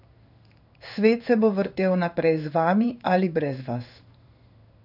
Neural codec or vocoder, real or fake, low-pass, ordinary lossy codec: codec, 16 kHz in and 24 kHz out, 1 kbps, XY-Tokenizer; fake; 5.4 kHz; none